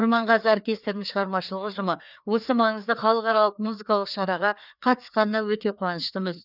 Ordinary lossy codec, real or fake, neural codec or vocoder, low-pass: none; fake; codec, 16 kHz, 2 kbps, FreqCodec, larger model; 5.4 kHz